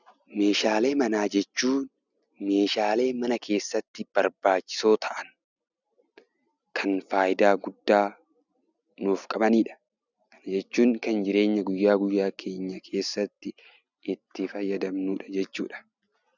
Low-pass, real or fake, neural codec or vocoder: 7.2 kHz; real; none